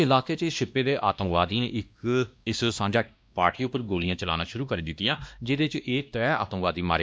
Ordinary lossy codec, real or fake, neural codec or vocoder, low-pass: none; fake; codec, 16 kHz, 1 kbps, X-Codec, WavLM features, trained on Multilingual LibriSpeech; none